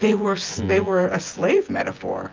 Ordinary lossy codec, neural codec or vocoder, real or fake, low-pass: Opus, 24 kbps; vocoder, 24 kHz, 100 mel bands, Vocos; fake; 7.2 kHz